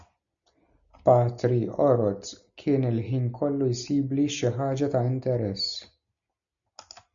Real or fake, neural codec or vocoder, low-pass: real; none; 7.2 kHz